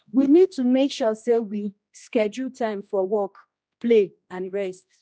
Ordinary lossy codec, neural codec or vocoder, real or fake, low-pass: none; codec, 16 kHz, 1 kbps, X-Codec, HuBERT features, trained on general audio; fake; none